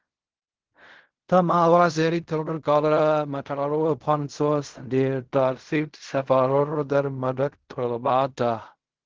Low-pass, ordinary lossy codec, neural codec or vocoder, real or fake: 7.2 kHz; Opus, 16 kbps; codec, 16 kHz in and 24 kHz out, 0.4 kbps, LongCat-Audio-Codec, fine tuned four codebook decoder; fake